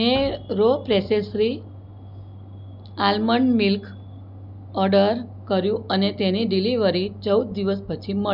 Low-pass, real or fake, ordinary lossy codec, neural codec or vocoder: 5.4 kHz; real; none; none